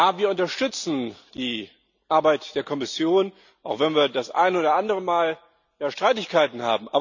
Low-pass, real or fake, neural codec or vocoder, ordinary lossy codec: 7.2 kHz; real; none; none